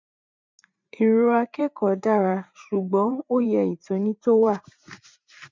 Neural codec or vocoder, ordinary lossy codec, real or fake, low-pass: vocoder, 44.1 kHz, 128 mel bands every 512 samples, BigVGAN v2; MP3, 64 kbps; fake; 7.2 kHz